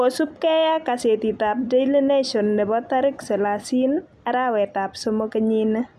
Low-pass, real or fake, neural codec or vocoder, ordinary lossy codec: 14.4 kHz; real; none; none